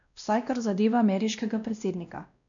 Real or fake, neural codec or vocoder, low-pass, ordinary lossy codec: fake; codec, 16 kHz, 1 kbps, X-Codec, WavLM features, trained on Multilingual LibriSpeech; 7.2 kHz; none